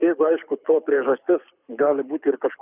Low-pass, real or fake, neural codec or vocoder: 3.6 kHz; fake; codec, 24 kHz, 6 kbps, HILCodec